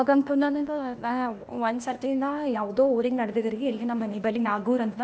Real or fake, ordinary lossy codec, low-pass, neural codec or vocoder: fake; none; none; codec, 16 kHz, 0.8 kbps, ZipCodec